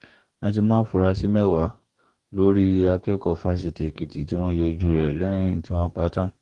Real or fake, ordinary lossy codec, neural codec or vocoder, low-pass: fake; Opus, 24 kbps; codec, 44.1 kHz, 2.6 kbps, DAC; 10.8 kHz